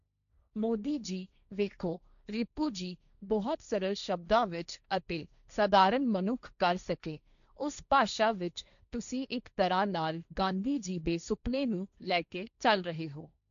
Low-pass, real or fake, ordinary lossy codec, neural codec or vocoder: 7.2 kHz; fake; none; codec, 16 kHz, 1.1 kbps, Voila-Tokenizer